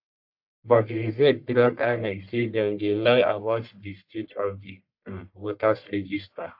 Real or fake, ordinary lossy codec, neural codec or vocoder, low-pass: fake; AAC, 48 kbps; codec, 44.1 kHz, 1.7 kbps, Pupu-Codec; 5.4 kHz